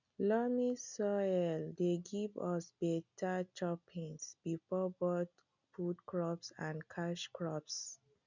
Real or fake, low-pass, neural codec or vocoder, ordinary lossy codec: real; 7.2 kHz; none; none